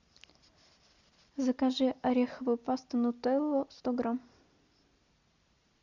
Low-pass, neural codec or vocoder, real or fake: 7.2 kHz; none; real